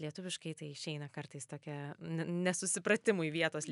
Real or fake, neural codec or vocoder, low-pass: real; none; 10.8 kHz